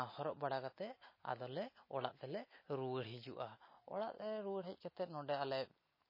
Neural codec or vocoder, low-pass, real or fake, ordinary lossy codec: none; 5.4 kHz; real; MP3, 24 kbps